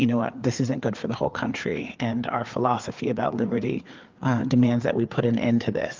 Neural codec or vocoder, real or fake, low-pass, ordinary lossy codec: codec, 16 kHz, 4 kbps, FreqCodec, larger model; fake; 7.2 kHz; Opus, 32 kbps